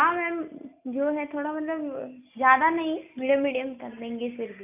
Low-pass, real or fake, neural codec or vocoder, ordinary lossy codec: 3.6 kHz; real; none; AAC, 32 kbps